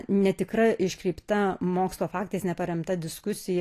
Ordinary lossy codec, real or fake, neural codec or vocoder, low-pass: AAC, 48 kbps; real; none; 14.4 kHz